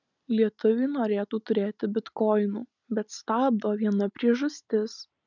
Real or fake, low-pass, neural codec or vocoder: real; 7.2 kHz; none